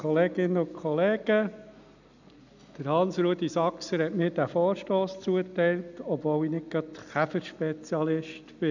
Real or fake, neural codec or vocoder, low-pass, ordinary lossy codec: real; none; 7.2 kHz; none